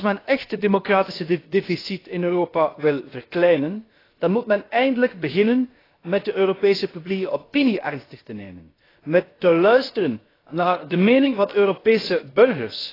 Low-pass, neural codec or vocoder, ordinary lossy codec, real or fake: 5.4 kHz; codec, 16 kHz, about 1 kbps, DyCAST, with the encoder's durations; AAC, 24 kbps; fake